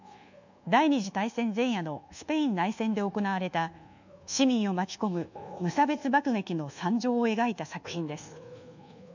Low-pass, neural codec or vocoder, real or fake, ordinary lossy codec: 7.2 kHz; codec, 24 kHz, 1.2 kbps, DualCodec; fake; none